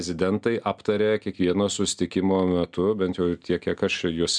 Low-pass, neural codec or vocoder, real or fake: 9.9 kHz; none; real